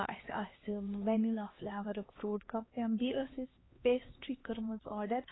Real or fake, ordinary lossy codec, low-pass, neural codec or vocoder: fake; AAC, 16 kbps; 7.2 kHz; codec, 16 kHz, 2 kbps, X-Codec, HuBERT features, trained on LibriSpeech